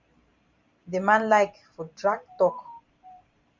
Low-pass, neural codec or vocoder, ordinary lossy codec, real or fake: 7.2 kHz; vocoder, 44.1 kHz, 128 mel bands every 512 samples, BigVGAN v2; Opus, 64 kbps; fake